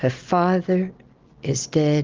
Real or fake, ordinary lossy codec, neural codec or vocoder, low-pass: real; Opus, 16 kbps; none; 7.2 kHz